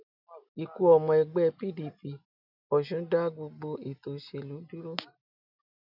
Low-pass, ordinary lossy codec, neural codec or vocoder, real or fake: 5.4 kHz; none; none; real